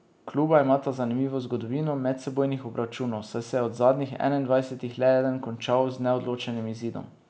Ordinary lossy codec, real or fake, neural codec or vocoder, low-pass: none; real; none; none